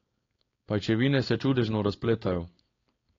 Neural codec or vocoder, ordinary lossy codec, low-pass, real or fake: codec, 16 kHz, 4.8 kbps, FACodec; AAC, 32 kbps; 7.2 kHz; fake